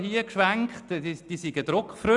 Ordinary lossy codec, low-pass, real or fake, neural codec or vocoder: MP3, 96 kbps; 10.8 kHz; real; none